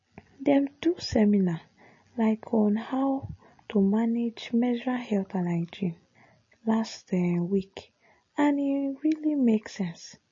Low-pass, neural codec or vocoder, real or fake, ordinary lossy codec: 7.2 kHz; none; real; MP3, 32 kbps